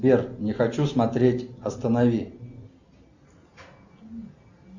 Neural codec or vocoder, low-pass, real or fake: none; 7.2 kHz; real